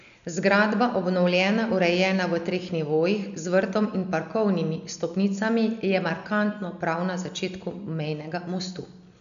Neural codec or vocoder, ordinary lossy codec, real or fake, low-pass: none; none; real; 7.2 kHz